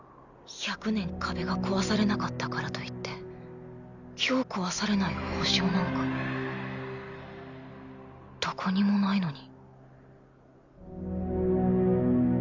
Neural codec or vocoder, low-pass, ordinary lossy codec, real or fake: none; 7.2 kHz; none; real